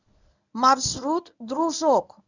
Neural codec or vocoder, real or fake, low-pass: codec, 24 kHz, 0.9 kbps, WavTokenizer, medium speech release version 1; fake; 7.2 kHz